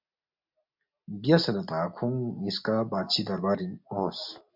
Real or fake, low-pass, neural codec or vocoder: real; 5.4 kHz; none